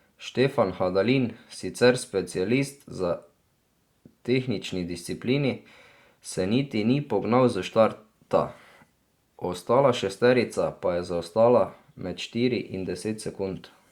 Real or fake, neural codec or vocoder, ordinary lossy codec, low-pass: real; none; Opus, 64 kbps; 19.8 kHz